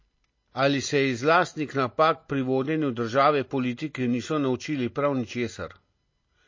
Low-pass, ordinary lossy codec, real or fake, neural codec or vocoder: 7.2 kHz; MP3, 32 kbps; real; none